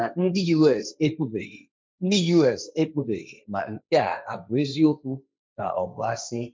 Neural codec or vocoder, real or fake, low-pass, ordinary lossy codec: codec, 16 kHz, 1.1 kbps, Voila-Tokenizer; fake; none; none